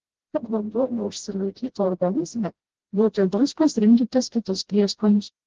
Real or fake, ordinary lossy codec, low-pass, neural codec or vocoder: fake; Opus, 16 kbps; 7.2 kHz; codec, 16 kHz, 0.5 kbps, FreqCodec, smaller model